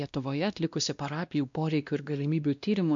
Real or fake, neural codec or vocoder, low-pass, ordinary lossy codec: fake; codec, 16 kHz, 1 kbps, X-Codec, WavLM features, trained on Multilingual LibriSpeech; 7.2 kHz; MP3, 64 kbps